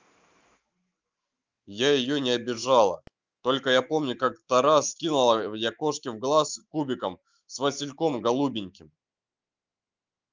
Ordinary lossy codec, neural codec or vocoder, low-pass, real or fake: Opus, 24 kbps; autoencoder, 48 kHz, 128 numbers a frame, DAC-VAE, trained on Japanese speech; 7.2 kHz; fake